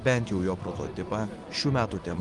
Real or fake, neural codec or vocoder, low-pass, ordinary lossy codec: real; none; 10.8 kHz; Opus, 24 kbps